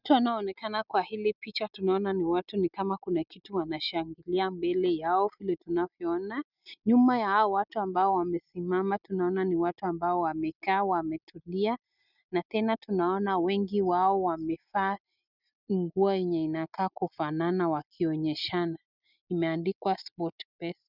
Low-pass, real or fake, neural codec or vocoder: 5.4 kHz; real; none